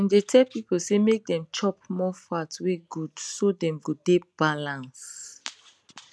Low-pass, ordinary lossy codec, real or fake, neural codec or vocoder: none; none; real; none